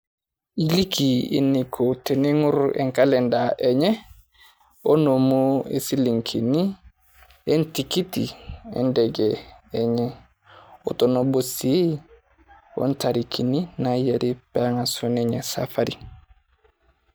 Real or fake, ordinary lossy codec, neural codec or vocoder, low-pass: real; none; none; none